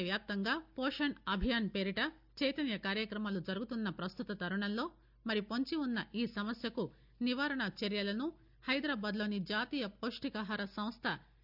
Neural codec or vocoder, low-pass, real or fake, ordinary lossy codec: none; 5.4 kHz; real; none